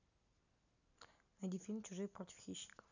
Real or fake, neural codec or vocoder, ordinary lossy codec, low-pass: real; none; none; 7.2 kHz